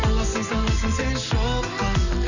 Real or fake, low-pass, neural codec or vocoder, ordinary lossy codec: real; 7.2 kHz; none; none